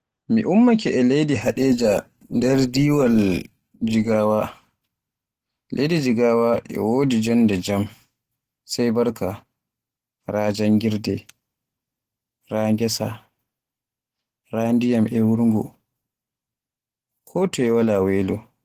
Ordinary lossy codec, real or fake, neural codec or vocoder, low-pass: Opus, 16 kbps; real; none; 14.4 kHz